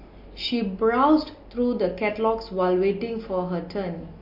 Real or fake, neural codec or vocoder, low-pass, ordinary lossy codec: real; none; 5.4 kHz; MP3, 32 kbps